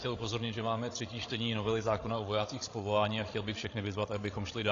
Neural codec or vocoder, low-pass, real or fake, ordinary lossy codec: codec, 16 kHz, 16 kbps, FreqCodec, larger model; 7.2 kHz; fake; AAC, 32 kbps